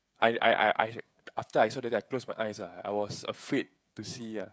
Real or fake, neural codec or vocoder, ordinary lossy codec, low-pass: fake; codec, 16 kHz, 16 kbps, FreqCodec, smaller model; none; none